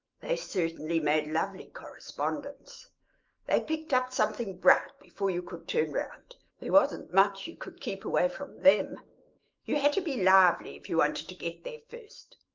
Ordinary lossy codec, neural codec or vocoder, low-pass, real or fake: Opus, 32 kbps; autoencoder, 48 kHz, 128 numbers a frame, DAC-VAE, trained on Japanese speech; 7.2 kHz; fake